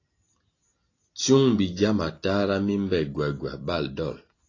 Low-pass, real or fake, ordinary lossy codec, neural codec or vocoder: 7.2 kHz; real; AAC, 32 kbps; none